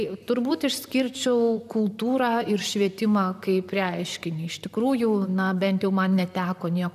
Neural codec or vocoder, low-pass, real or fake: vocoder, 44.1 kHz, 128 mel bands, Pupu-Vocoder; 14.4 kHz; fake